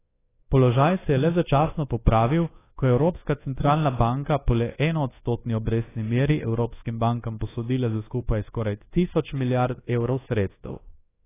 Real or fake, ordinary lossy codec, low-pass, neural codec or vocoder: fake; AAC, 16 kbps; 3.6 kHz; codec, 24 kHz, 1.2 kbps, DualCodec